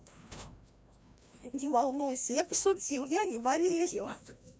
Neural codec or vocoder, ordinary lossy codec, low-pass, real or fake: codec, 16 kHz, 1 kbps, FreqCodec, larger model; none; none; fake